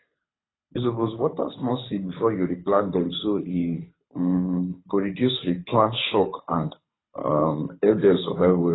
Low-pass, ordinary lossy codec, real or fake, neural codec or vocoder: 7.2 kHz; AAC, 16 kbps; fake; codec, 24 kHz, 6 kbps, HILCodec